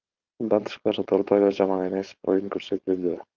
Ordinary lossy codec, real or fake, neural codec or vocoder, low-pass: Opus, 32 kbps; fake; codec, 16 kHz, 4.8 kbps, FACodec; 7.2 kHz